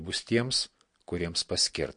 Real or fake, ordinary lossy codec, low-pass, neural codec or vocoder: real; MP3, 48 kbps; 9.9 kHz; none